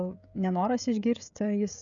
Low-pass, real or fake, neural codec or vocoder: 7.2 kHz; fake; codec, 16 kHz, 16 kbps, FreqCodec, smaller model